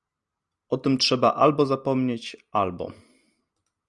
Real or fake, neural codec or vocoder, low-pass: real; none; 10.8 kHz